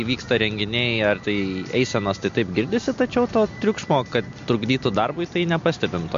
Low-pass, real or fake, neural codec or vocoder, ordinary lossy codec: 7.2 kHz; real; none; MP3, 48 kbps